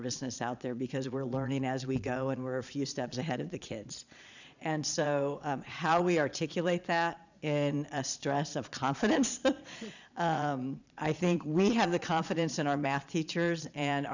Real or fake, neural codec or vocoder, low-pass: fake; vocoder, 22.05 kHz, 80 mel bands, Vocos; 7.2 kHz